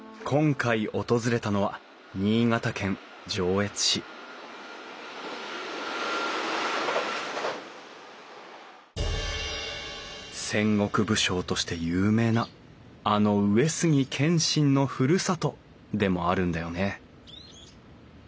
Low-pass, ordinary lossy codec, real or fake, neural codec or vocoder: none; none; real; none